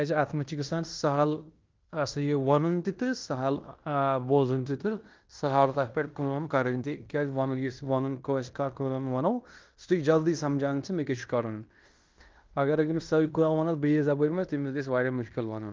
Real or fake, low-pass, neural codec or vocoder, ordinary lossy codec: fake; 7.2 kHz; codec, 16 kHz in and 24 kHz out, 0.9 kbps, LongCat-Audio-Codec, fine tuned four codebook decoder; Opus, 24 kbps